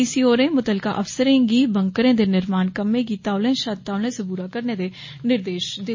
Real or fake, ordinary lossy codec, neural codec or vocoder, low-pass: real; MP3, 32 kbps; none; 7.2 kHz